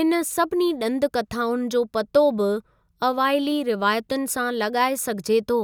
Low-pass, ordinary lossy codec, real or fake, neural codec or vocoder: none; none; real; none